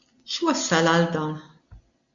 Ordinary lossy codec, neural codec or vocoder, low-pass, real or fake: AAC, 64 kbps; none; 7.2 kHz; real